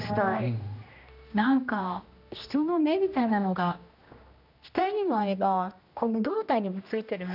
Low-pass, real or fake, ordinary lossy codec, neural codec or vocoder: 5.4 kHz; fake; none; codec, 16 kHz, 1 kbps, X-Codec, HuBERT features, trained on general audio